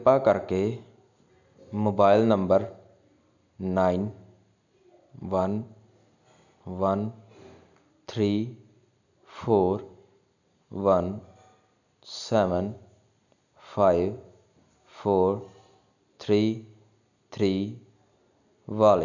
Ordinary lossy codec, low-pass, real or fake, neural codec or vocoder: none; 7.2 kHz; real; none